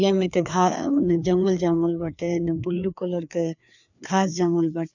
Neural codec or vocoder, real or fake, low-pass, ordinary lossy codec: codec, 16 kHz, 2 kbps, FreqCodec, larger model; fake; 7.2 kHz; none